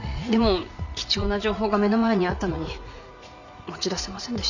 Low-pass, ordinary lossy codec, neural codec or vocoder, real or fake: 7.2 kHz; none; vocoder, 44.1 kHz, 80 mel bands, Vocos; fake